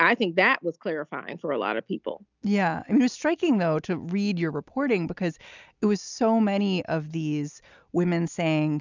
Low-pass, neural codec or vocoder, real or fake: 7.2 kHz; none; real